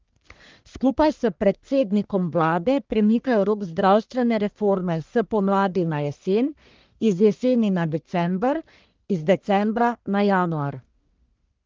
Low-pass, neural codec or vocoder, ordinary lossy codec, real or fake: 7.2 kHz; codec, 44.1 kHz, 1.7 kbps, Pupu-Codec; Opus, 24 kbps; fake